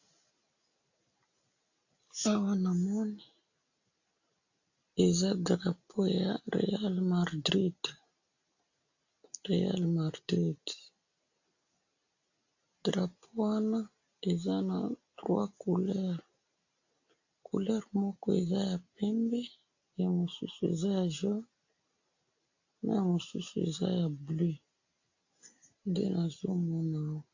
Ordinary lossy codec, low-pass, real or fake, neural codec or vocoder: AAC, 48 kbps; 7.2 kHz; real; none